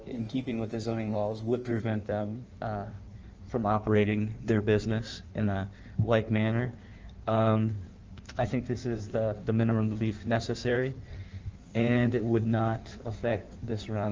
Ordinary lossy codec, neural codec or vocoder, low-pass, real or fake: Opus, 24 kbps; codec, 16 kHz in and 24 kHz out, 1.1 kbps, FireRedTTS-2 codec; 7.2 kHz; fake